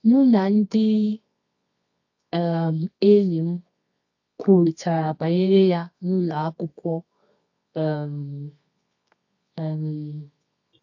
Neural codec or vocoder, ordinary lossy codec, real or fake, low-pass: codec, 24 kHz, 0.9 kbps, WavTokenizer, medium music audio release; AAC, 48 kbps; fake; 7.2 kHz